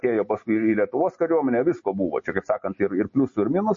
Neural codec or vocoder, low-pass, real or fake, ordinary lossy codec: none; 7.2 kHz; real; MP3, 32 kbps